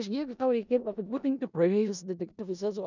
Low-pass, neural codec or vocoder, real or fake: 7.2 kHz; codec, 16 kHz in and 24 kHz out, 0.4 kbps, LongCat-Audio-Codec, four codebook decoder; fake